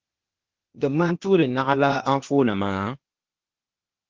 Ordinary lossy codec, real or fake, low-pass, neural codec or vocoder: Opus, 16 kbps; fake; 7.2 kHz; codec, 16 kHz, 0.8 kbps, ZipCodec